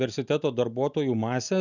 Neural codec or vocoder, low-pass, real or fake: none; 7.2 kHz; real